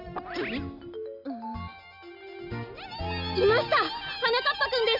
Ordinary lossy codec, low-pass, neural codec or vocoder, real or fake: none; 5.4 kHz; none; real